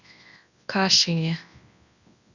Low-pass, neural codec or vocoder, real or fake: 7.2 kHz; codec, 24 kHz, 0.9 kbps, WavTokenizer, large speech release; fake